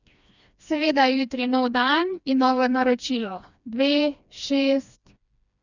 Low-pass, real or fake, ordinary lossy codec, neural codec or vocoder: 7.2 kHz; fake; none; codec, 16 kHz, 2 kbps, FreqCodec, smaller model